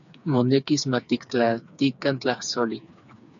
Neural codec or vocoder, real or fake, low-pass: codec, 16 kHz, 4 kbps, FreqCodec, smaller model; fake; 7.2 kHz